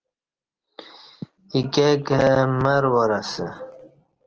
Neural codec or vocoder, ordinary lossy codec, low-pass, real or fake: none; Opus, 16 kbps; 7.2 kHz; real